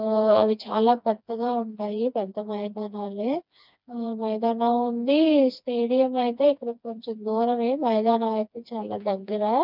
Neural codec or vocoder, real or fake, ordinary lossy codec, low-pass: codec, 16 kHz, 2 kbps, FreqCodec, smaller model; fake; none; 5.4 kHz